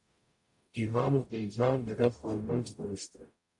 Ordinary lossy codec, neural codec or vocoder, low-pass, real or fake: AAC, 64 kbps; codec, 44.1 kHz, 0.9 kbps, DAC; 10.8 kHz; fake